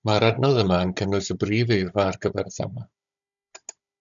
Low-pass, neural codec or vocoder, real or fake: 7.2 kHz; codec, 16 kHz, 16 kbps, FunCodec, trained on Chinese and English, 50 frames a second; fake